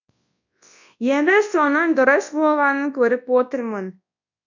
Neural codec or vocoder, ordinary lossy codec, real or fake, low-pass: codec, 24 kHz, 0.9 kbps, WavTokenizer, large speech release; none; fake; 7.2 kHz